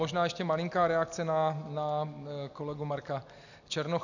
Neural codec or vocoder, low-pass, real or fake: none; 7.2 kHz; real